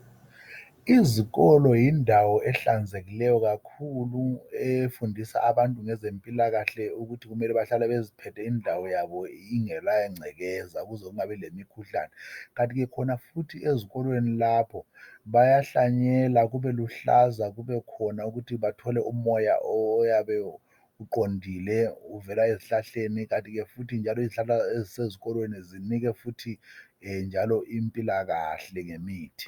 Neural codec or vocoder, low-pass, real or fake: none; 19.8 kHz; real